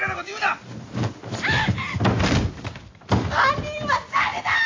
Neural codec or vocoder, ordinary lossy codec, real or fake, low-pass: none; AAC, 32 kbps; real; 7.2 kHz